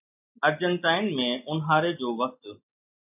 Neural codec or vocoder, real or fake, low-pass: none; real; 3.6 kHz